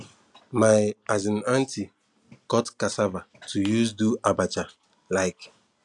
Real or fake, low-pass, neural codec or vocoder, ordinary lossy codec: real; 10.8 kHz; none; none